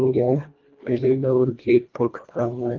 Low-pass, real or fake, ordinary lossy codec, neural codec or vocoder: 7.2 kHz; fake; Opus, 24 kbps; codec, 24 kHz, 1.5 kbps, HILCodec